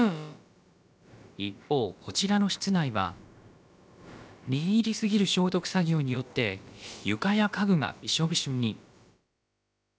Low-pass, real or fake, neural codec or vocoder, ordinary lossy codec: none; fake; codec, 16 kHz, about 1 kbps, DyCAST, with the encoder's durations; none